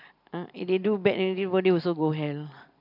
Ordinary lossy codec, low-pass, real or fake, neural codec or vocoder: none; 5.4 kHz; real; none